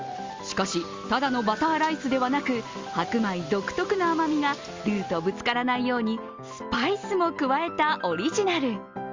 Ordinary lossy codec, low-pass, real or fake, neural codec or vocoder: Opus, 32 kbps; 7.2 kHz; real; none